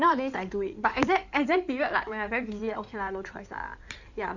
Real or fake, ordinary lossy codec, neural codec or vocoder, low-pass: fake; none; codec, 16 kHz in and 24 kHz out, 2.2 kbps, FireRedTTS-2 codec; 7.2 kHz